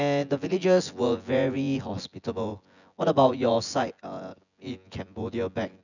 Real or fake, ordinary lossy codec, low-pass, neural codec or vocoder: fake; none; 7.2 kHz; vocoder, 24 kHz, 100 mel bands, Vocos